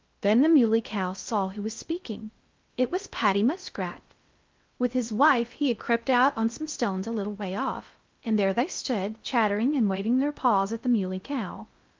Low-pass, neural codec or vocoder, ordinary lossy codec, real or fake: 7.2 kHz; codec, 16 kHz in and 24 kHz out, 0.6 kbps, FocalCodec, streaming, 2048 codes; Opus, 24 kbps; fake